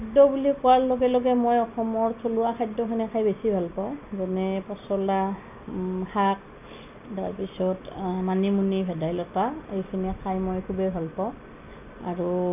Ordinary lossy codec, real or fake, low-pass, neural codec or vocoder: AAC, 32 kbps; real; 3.6 kHz; none